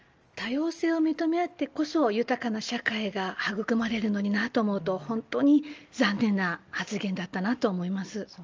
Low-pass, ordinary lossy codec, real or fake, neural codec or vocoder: 7.2 kHz; Opus, 24 kbps; real; none